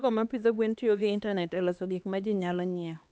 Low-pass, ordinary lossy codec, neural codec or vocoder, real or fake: none; none; codec, 16 kHz, 2 kbps, X-Codec, HuBERT features, trained on LibriSpeech; fake